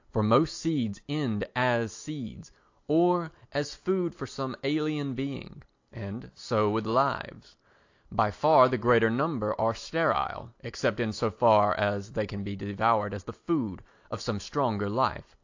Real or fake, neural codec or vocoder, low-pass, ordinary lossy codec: real; none; 7.2 kHz; AAC, 48 kbps